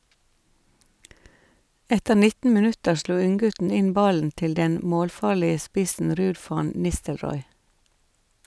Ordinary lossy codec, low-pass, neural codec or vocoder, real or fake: none; none; none; real